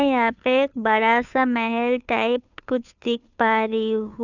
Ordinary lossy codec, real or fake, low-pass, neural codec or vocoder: none; fake; 7.2 kHz; codec, 16 kHz, 2 kbps, FunCodec, trained on Chinese and English, 25 frames a second